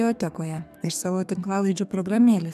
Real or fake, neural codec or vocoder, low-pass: fake; codec, 32 kHz, 1.9 kbps, SNAC; 14.4 kHz